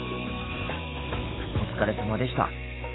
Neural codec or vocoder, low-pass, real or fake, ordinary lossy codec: autoencoder, 48 kHz, 128 numbers a frame, DAC-VAE, trained on Japanese speech; 7.2 kHz; fake; AAC, 16 kbps